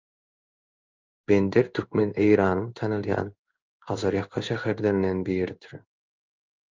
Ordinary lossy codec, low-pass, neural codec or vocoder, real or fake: Opus, 24 kbps; 7.2 kHz; codec, 16 kHz in and 24 kHz out, 1 kbps, XY-Tokenizer; fake